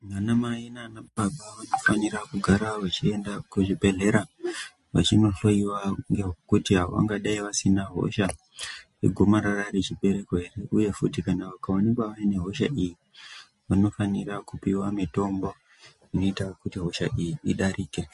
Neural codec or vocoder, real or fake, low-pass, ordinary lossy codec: none; real; 14.4 kHz; MP3, 48 kbps